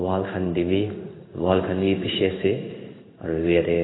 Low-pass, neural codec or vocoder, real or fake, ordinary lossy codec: 7.2 kHz; codec, 16 kHz in and 24 kHz out, 1 kbps, XY-Tokenizer; fake; AAC, 16 kbps